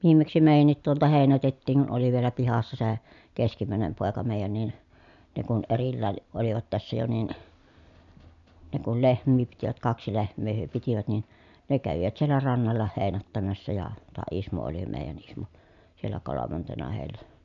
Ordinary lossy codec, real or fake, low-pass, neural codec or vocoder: none; real; 7.2 kHz; none